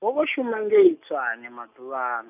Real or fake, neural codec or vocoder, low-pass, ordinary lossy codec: real; none; 3.6 kHz; none